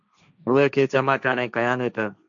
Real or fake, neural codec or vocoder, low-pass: fake; codec, 16 kHz, 1.1 kbps, Voila-Tokenizer; 7.2 kHz